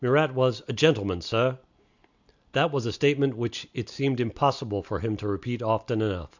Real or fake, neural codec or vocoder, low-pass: real; none; 7.2 kHz